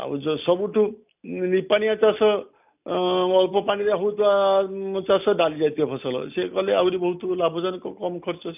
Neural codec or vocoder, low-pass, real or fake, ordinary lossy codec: none; 3.6 kHz; real; none